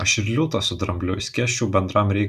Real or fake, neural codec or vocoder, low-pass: real; none; 14.4 kHz